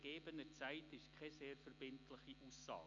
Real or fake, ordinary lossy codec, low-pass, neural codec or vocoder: real; none; 7.2 kHz; none